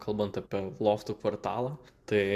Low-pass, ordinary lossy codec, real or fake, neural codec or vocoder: 14.4 kHz; MP3, 96 kbps; real; none